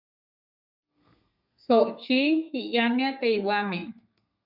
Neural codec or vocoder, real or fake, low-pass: codec, 32 kHz, 1.9 kbps, SNAC; fake; 5.4 kHz